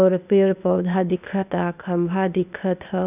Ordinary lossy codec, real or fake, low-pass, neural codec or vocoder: none; fake; 3.6 kHz; codec, 16 kHz, 0.8 kbps, ZipCodec